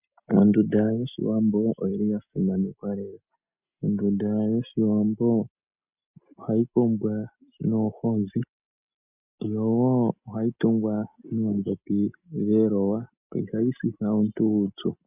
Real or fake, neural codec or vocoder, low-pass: real; none; 3.6 kHz